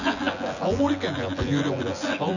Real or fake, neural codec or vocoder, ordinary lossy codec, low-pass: fake; vocoder, 24 kHz, 100 mel bands, Vocos; none; 7.2 kHz